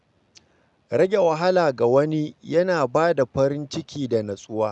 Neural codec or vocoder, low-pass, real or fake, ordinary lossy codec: none; 10.8 kHz; real; none